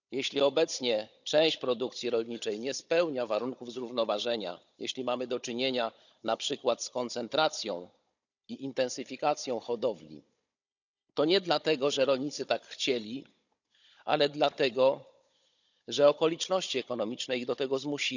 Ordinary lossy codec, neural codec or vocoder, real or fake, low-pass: none; codec, 16 kHz, 16 kbps, FunCodec, trained on Chinese and English, 50 frames a second; fake; 7.2 kHz